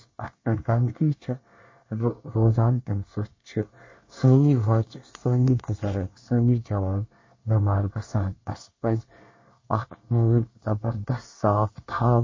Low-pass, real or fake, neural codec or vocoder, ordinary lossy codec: 7.2 kHz; fake; codec, 24 kHz, 1 kbps, SNAC; MP3, 32 kbps